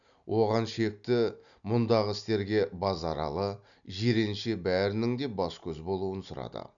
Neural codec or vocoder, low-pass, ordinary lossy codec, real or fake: none; 7.2 kHz; none; real